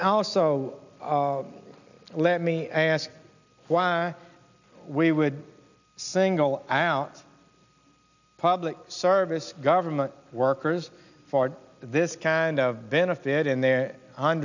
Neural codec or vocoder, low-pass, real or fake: none; 7.2 kHz; real